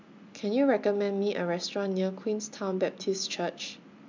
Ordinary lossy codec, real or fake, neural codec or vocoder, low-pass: MP3, 64 kbps; real; none; 7.2 kHz